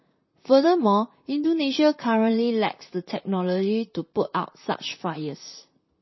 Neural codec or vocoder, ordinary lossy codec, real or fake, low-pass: vocoder, 44.1 kHz, 128 mel bands, Pupu-Vocoder; MP3, 24 kbps; fake; 7.2 kHz